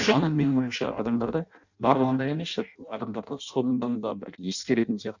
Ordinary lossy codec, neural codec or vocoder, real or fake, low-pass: none; codec, 16 kHz in and 24 kHz out, 0.6 kbps, FireRedTTS-2 codec; fake; 7.2 kHz